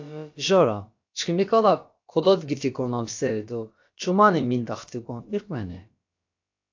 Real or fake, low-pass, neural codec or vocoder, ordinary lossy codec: fake; 7.2 kHz; codec, 16 kHz, about 1 kbps, DyCAST, with the encoder's durations; AAC, 48 kbps